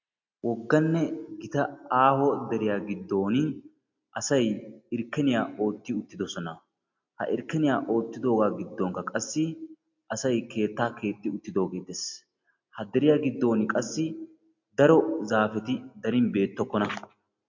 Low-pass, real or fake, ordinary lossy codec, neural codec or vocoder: 7.2 kHz; real; MP3, 48 kbps; none